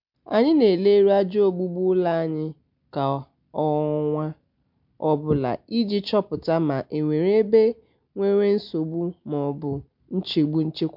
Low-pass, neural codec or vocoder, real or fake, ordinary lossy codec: 5.4 kHz; none; real; MP3, 48 kbps